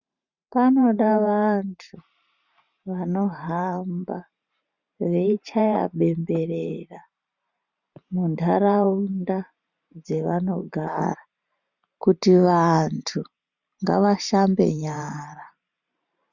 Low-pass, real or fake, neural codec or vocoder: 7.2 kHz; fake; vocoder, 44.1 kHz, 128 mel bands every 512 samples, BigVGAN v2